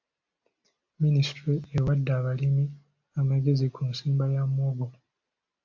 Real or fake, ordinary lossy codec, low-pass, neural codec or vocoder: real; AAC, 48 kbps; 7.2 kHz; none